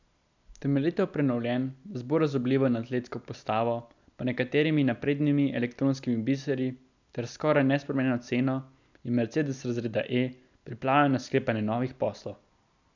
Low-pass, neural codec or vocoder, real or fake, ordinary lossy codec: 7.2 kHz; none; real; none